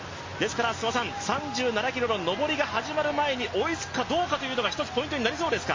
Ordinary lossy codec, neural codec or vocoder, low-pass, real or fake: MP3, 32 kbps; none; 7.2 kHz; real